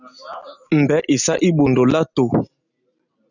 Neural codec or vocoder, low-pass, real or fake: none; 7.2 kHz; real